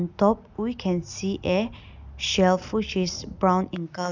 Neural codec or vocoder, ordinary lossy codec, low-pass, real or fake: none; none; 7.2 kHz; real